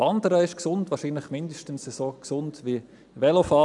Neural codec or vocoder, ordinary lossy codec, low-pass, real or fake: none; none; 10.8 kHz; real